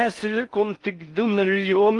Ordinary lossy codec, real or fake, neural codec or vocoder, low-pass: Opus, 32 kbps; fake; codec, 16 kHz in and 24 kHz out, 0.6 kbps, FocalCodec, streaming, 4096 codes; 10.8 kHz